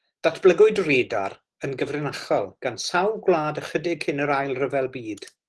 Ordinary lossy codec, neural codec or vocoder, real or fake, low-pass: Opus, 16 kbps; none; real; 10.8 kHz